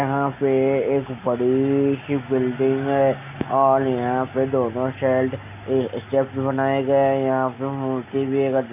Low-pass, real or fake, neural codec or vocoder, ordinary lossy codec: 3.6 kHz; real; none; none